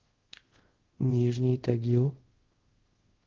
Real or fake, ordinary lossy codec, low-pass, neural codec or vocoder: fake; Opus, 16 kbps; 7.2 kHz; codec, 24 kHz, 0.5 kbps, DualCodec